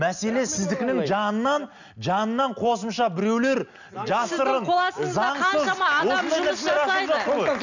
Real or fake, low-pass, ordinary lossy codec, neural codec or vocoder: real; 7.2 kHz; none; none